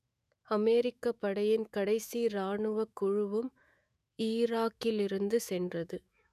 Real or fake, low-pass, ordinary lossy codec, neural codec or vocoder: fake; 14.4 kHz; none; autoencoder, 48 kHz, 128 numbers a frame, DAC-VAE, trained on Japanese speech